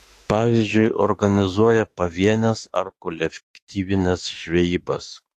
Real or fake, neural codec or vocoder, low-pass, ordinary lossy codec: fake; autoencoder, 48 kHz, 32 numbers a frame, DAC-VAE, trained on Japanese speech; 14.4 kHz; AAC, 48 kbps